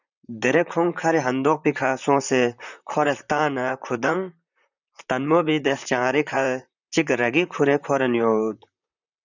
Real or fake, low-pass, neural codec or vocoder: fake; 7.2 kHz; vocoder, 44.1 kHz, 128 mel bands, Pupu-Vocoder